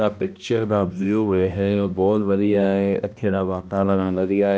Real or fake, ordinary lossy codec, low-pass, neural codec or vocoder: fake; none; none; codec, 16 kHz, 1 kbps, X-Codec, HuBERT features, trained on balanced general audio